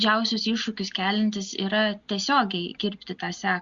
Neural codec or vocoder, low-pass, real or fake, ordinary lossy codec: none; 7.2 kHz; real; Opus, 64 kbps